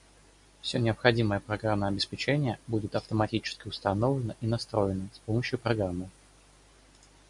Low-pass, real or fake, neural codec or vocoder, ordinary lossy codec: 10.8 kHz; real; none; MP3, 64 kbps